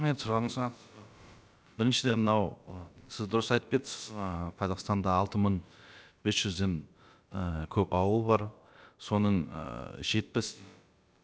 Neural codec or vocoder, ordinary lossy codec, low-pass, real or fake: codec, 16 kHz, about 1 kbps, DyCAST, with the encoder's durations; none; none; fake